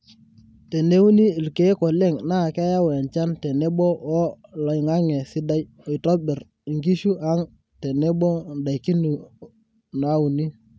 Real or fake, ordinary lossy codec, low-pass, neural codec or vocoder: real; none; none; none